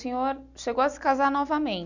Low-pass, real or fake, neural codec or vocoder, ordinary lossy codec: 7.2 kHz; real; none; none